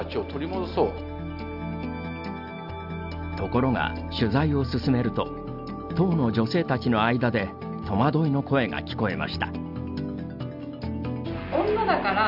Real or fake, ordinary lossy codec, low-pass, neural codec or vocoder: real; none; 5.4 kHz; none